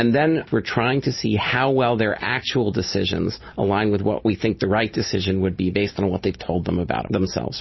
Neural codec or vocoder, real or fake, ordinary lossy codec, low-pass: none; real; MP3, 24 kbps; 7.2 kHz